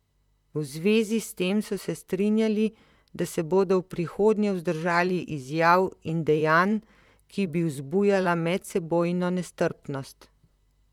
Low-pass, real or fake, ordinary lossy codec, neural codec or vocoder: 19.8 kHz; fake; none; vocoder, 44.1 kHz, 128 mel bands, Pupu-Vocoder